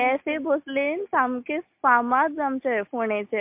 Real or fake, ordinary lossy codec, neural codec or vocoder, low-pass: real; MP3, 32 kbps; none; 3.6 kHz